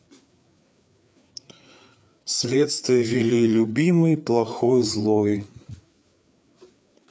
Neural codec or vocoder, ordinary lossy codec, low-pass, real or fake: codec, 16 kHz, 4 kbps, FreqCodec, larger model; none; none; fake